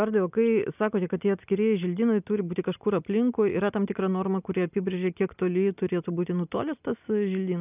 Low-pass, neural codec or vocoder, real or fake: 3.6 kHz; none; real